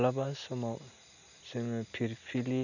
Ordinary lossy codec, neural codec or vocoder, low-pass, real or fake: none; none; 7.2 kHz; real